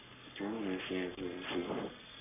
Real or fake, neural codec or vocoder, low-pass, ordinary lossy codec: fake; codec, 44.1 kHz, 3.4 kbps, Pupu-Codec; 3.6 kHz; none